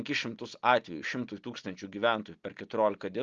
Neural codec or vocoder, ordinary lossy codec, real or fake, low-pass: none; Opus, 32 kbps; real; 7.2 kHz